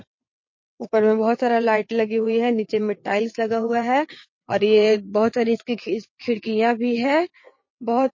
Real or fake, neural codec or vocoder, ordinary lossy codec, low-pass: fake; vocoder, 22.05 kHz, 80 mel bands, WaveNeXt; MP3, 32 kbps; 7.2 kHz